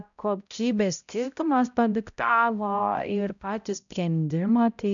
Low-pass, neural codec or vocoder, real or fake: 7.2 kHz; codec, 16 kHz, 0.5 kbps, X-Codec, HuBERT features, trained on balanced general audio; fake